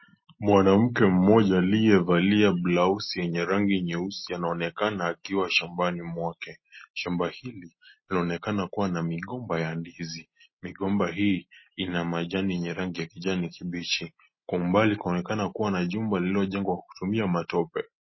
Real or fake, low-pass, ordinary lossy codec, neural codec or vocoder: real; 7.2 kHz; MP3, 24 kbps; none